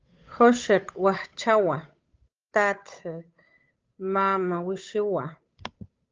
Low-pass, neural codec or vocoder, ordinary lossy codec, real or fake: 7.2 kHz; codec, 16 kHz, 8 kbps, FunCodec, trained on Chinese and English, 25 frames a second; Opus, 24 kbps; fake